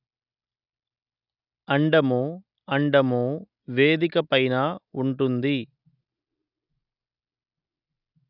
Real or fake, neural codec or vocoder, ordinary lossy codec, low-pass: real; none; none; 5.4 kHz